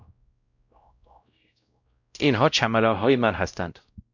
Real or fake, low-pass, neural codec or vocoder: fake; 7.2 kHz; codec, 16 kHz, 0.5 kbps, X-Codec, WavLM features, trained on Multilingual LibriSpeech